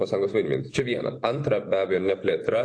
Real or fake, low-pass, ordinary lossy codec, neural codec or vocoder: fake; 9.9 kHz; AAC, 48 kbps; vocoder, 24 kHz, 100 mel bands, Vocos